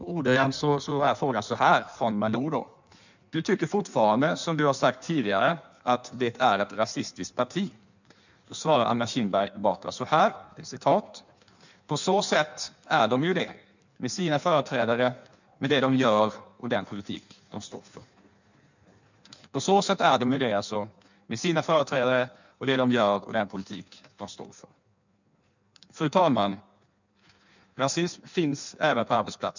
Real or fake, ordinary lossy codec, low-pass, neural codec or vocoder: fake; none; 7.2 kHz; codec, 16 kHz in and 24 kHz out, 1.1 kbps, FireRedTTS-2 codec